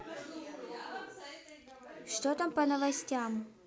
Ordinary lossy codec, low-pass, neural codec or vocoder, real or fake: none; none; none; real